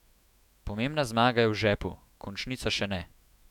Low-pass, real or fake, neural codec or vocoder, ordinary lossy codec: 19.8 kHz; fake; autoencoder, 48 kHz, 128 numbers a frame, DAC-VAE, trained on Japanese speech; none